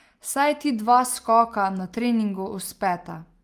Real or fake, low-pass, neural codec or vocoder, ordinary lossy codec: real; 14.4 kHz; none; Opus, 32 kbps